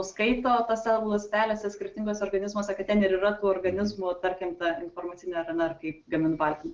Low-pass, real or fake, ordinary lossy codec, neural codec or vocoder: 7.2 kHz; real; Opus, 16 kbps; none